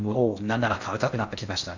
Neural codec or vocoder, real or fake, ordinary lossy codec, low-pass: codec, 16 kHz in and 24 kHz out, 0.6 kbps, FocalCodec, streaming, 4096 codes; fake; none; 7.2 kHz